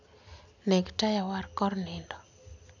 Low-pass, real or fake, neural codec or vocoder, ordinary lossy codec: 7.2 kHz; real; none; none